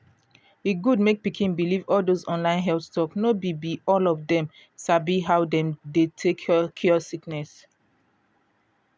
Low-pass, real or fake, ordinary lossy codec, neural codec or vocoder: none; real; none; none